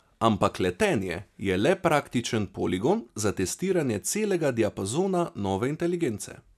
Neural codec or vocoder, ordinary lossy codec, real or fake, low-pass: none; none; real; 14.4 kHz